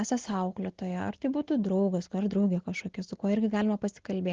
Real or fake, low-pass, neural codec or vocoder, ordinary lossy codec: real; 7.2 kHz; none; Opus, 16 kbps